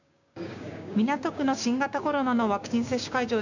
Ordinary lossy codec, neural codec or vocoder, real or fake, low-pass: none; codec, 16 kHz, 6 kbps, DAC; fake; 7.2 kHz